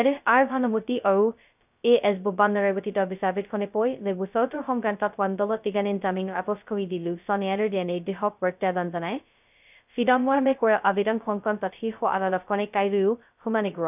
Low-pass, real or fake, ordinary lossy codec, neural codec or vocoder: 3.6 kHz; fake; none; codec, 16 kHz, 0.2 kbps, FocalCodec